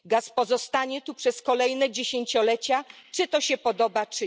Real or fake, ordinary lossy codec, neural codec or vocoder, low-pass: real; none; none; none